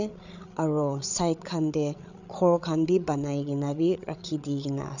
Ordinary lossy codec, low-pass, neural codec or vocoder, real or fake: none; 7.2 kHz; codec, 16 kHz, 8 kbps, FreqCodec, larger model; fake